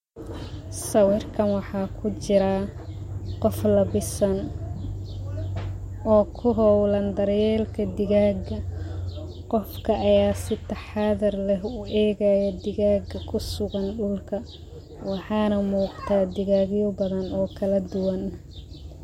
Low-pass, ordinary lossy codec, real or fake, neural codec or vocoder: 19.8 kHz; MP3, 64 kbps; real; none